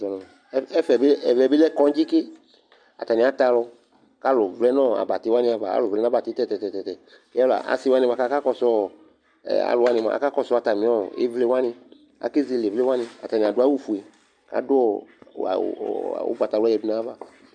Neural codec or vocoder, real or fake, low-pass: none; real; 9.9 kHz